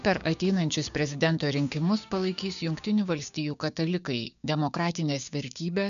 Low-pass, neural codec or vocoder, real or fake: 7.2 kHz; codec, 16 kHz, 6 kbps, DAC; fake